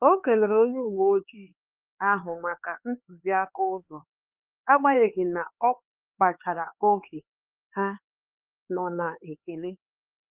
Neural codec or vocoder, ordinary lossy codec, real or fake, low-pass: codec, 16 kHz, 4 kbps, X-Codec, HuBERT features, trained on LibriSpeech; Opus, 32 kbps; fake; 3.6 kHz